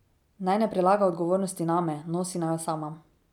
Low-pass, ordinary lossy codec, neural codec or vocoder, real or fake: 19.8 kHz; none; none; real